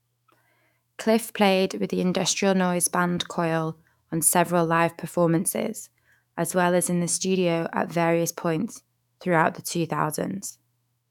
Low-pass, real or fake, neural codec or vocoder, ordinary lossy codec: 19.8 kHz; fake; autoencoder, 48 kHz, 128 numbers a frame, DAC-VAE, trained on Japanese speech; none